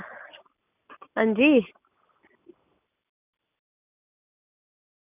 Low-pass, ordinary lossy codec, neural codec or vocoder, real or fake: 3.6 kHz; none; none; real